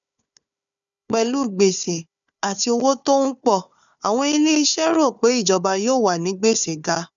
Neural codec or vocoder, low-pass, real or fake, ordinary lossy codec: codec, 16 kHz, 4 kbps, FunCodec, trained on Chinese and English, 50 frames a second; 7.2 kHz; fake; none